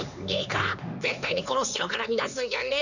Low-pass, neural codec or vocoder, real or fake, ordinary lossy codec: 7.2 kHz; codec, 16 kHz, 2 kbps, X-Codec, HuBERT features, trained on LibriSpeech; fake; none